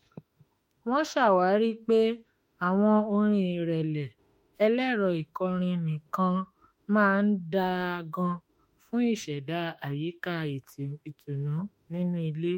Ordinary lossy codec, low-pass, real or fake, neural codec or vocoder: MP3, 64 kbps; 19.8 kHz; fake; autoencoder, 48 kHz, 32 numbers a frame, DAC-VAE, trained on Japanese speech